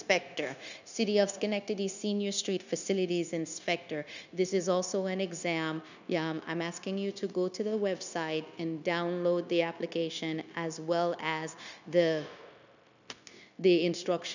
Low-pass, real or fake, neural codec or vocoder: 7.2 kHz; fake; codec, 16 kHz, 0.9 kbps, LongCat-Audio-Codec